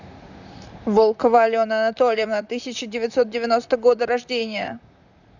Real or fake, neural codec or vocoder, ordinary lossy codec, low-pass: fake; vocoder, 44.1 kHz, 128 mel bands, Pupu-Vocoder; none; 7.2 kHz